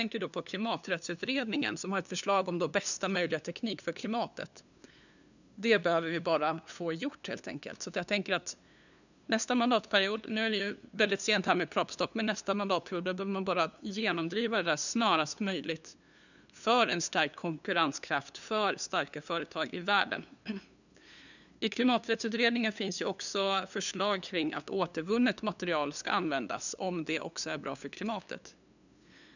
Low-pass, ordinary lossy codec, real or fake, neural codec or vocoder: 7.2 kHz; none; fake; codec, 16 kHz, 2 kbps, FunCodec, trained on LibriTTS, 25 frames a second